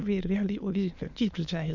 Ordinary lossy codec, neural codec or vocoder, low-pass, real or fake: none; autoencoder, 22.05 kHz, a latent of 192 numbers a frame, VITS, trained on many speakers; 7.2 kHz; fake